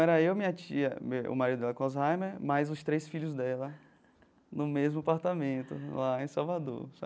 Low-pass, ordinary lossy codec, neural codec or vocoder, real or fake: none; none; none; real